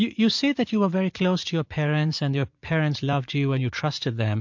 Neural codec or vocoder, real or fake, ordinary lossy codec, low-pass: vocoder, 44.1 kHz, 80 mel bands, Vocos; fake; MP3, 48 kbps; 7.2 kHz